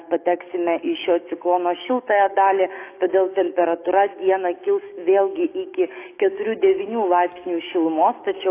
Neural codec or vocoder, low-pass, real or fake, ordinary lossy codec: codec, 44.1 kHz, 7.8 kbps, DAC; 3.6 kHz; fake; AAC, 24 kbps